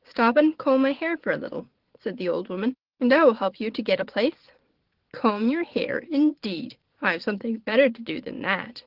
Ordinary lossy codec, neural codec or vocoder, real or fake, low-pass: Opus, 16 kbps; vocoder, 22.05 kHz, 80 mel bands, Vocos; fake; 5.4 kHz